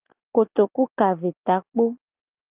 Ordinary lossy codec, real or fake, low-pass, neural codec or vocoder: Opus, 24 kbps; real; 3.6 kHz; none